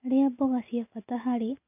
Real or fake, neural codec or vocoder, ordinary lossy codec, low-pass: real; none; none; 3.6 kHz